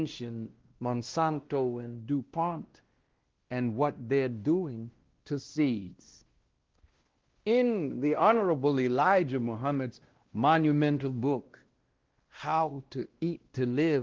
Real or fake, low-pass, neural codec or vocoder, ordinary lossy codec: fake; 7.2 kHz; codec, 16 kHz, 1 kbps, X-Codec, WavLM features, trained on Multilingual LibriSpeech; Opus, 16 kbps